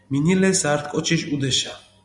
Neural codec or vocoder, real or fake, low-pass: none; real; 10.8 kHz